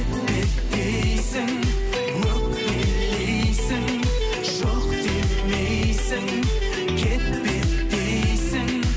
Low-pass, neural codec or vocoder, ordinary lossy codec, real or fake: none; none; none; real